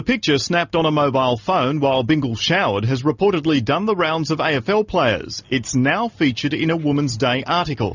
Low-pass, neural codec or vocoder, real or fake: 7.2 kHz; none; real